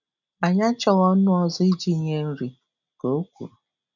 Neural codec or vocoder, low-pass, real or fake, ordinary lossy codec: none; 7.2 kHz; real; none